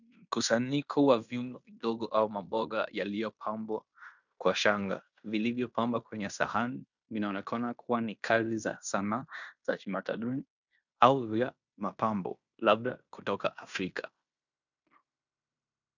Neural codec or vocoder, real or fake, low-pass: codec, 16 kHz in and 24 kHz out, 0.9 kbps, LongCat-Audio-Codec, fine tuned four codebook decoder; fake; 7.2 kHz